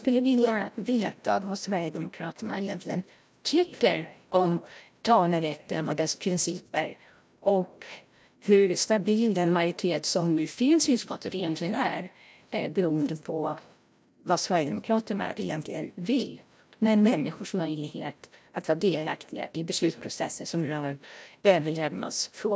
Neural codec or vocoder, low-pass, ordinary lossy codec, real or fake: codec, 16 kHz, 0.5 kbps, FreqCodec, larger model; none; none; fake